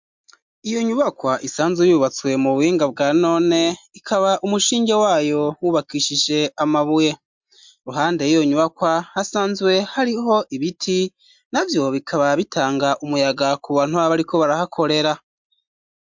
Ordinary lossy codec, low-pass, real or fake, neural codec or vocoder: MP3, 64 kbps; 7.2 kHz; real; none